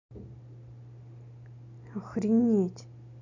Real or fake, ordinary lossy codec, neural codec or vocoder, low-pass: real; none; none; 7.2 kHz